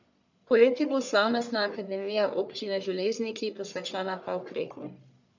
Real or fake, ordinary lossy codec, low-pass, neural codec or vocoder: fake; none; 7.2 kHz; codec, 44.1 kHz, 1.7 kbps, Pupu-Codec